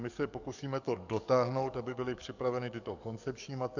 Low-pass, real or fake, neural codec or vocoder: 7.2 kHz; fake; codec, 44.1 kHz, 7.8 kbps, DAC